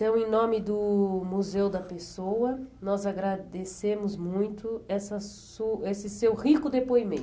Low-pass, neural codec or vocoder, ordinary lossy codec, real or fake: none; none; none; real